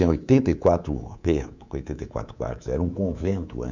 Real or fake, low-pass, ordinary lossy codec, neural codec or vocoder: fake; 7.2 kHz; none; codec, 24 kHz, 3.1 kbps, DualCodec